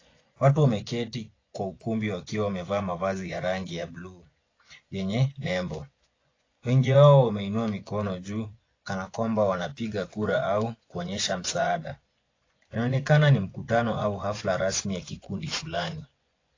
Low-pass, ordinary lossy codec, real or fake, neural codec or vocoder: 7.2 kHz; AAC, 32 kbps; fake; vocoder, 24 kHz, 100 mel bands, Vocos